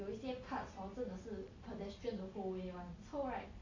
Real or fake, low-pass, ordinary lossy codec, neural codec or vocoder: real; 7.2 kHz; AAC, 32 kbps; none